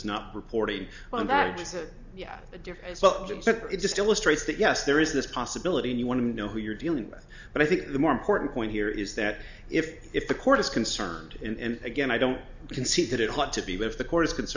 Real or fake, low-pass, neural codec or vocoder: real; 7.2 kHz; none